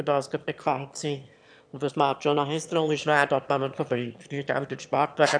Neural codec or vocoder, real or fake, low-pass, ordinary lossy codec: autoencoder, 22.05 kHz, a latent of 192 numbers a frame, VITS, trained on one speaker; fake; 9.9 kHz; none